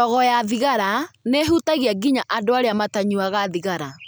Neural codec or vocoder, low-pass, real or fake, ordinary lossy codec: none; none; real; none